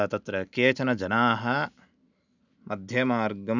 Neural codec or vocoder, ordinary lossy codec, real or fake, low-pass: none; none; real; 7.2 kHz